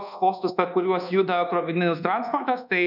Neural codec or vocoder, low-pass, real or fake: codec, 24 kHz, 1.2 kbps, DualCodec; 5.4 kHz; fake